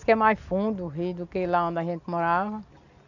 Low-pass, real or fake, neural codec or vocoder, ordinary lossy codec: 7.2 kHz; real; none; none